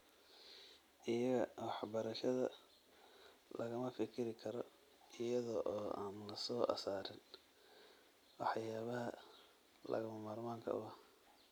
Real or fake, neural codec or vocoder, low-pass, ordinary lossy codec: real; none; none; none